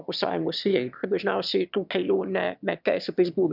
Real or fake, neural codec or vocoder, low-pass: fake; autoencoder, 22.05 kHz, a latent of 192 numbers a frame, VITS, trained on one speaker; 5.4 kHz